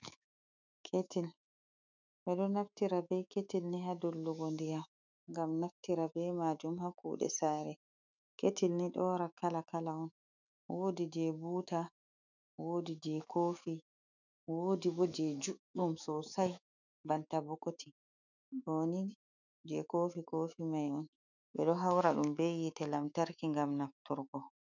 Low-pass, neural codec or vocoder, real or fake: 7.2 kHz; autoencoder, 48 kHz, 128 numbers a frame, DAC-VAE, trained on Japanese speech; fake